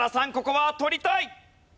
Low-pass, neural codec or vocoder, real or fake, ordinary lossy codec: none; none; real; none